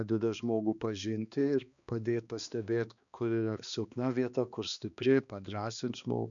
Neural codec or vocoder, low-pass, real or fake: codec, 16 kHz, 2 kbps, X-Codec, HuBERT features, trained on balanced general audio; 7.2 kHz; fake